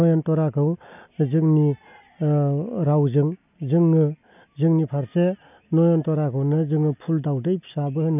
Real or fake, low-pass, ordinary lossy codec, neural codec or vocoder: real; 3.6 kHz; none; none